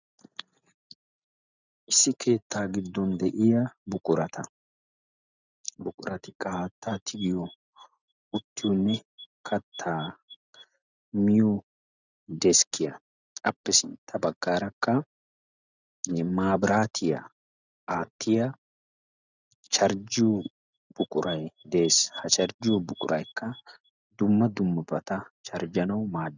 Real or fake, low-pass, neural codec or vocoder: real; 7.2 kHz; none